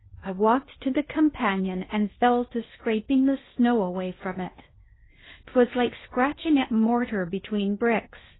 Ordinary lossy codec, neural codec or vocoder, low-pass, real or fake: AAC, 16 kbps; codec, 16 kHz in and 24 kHz out, 0.8 kbps, FocalCodec, streaming, 65536 codes; 7.2 kHz; fake